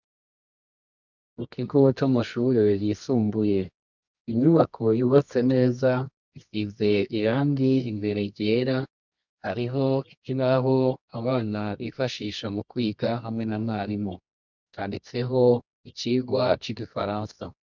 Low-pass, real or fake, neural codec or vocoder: 7.2 kHz; fake; codec, 24 kHz, 0.9 kbps, WavTokenizer, medium music audio release